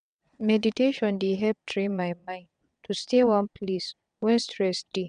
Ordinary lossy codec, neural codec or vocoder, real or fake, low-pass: none; vocoder, 22.05 kHz, 80 mel bands, WaveNeXt; fake; 9.9 kHz